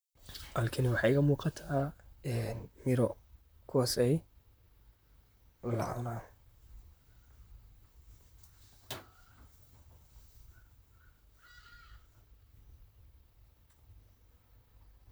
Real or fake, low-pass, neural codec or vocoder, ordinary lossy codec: fake; none; vocoder, 44.1 kHz, 128 mel bands, Pupu-Vocoder; none